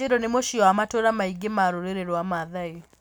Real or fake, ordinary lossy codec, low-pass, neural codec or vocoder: real; none; none; none